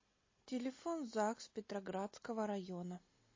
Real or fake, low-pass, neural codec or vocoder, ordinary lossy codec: real; 7.2 kHz; none; MP3, 32 kbps